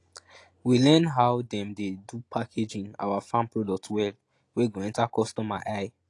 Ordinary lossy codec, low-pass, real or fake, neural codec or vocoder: AAC, 48 kbps; 10.8 kHz; real; none